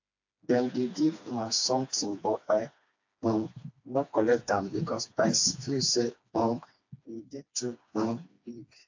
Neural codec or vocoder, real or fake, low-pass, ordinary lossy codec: codec, 16 kHz, 2 kbps, FreqCodec, smaller model; fake; 7.2 kHz; AAC, 48 kbps